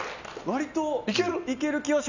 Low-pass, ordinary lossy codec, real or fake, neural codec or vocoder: 7.2 kHz; none; real; none